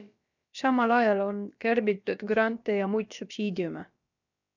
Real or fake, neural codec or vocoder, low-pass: fake; codec, 16 kHz, about 1 kbps, DyCAST, with the encoder's durations; 7.2 kHz